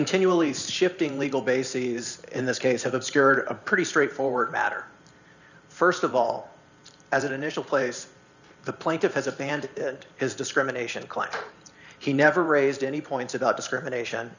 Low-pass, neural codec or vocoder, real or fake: 7.2 kHz; vocoder, 44.1 kHz, 128 mel bands every 512 samples, BigVGAN v2; fake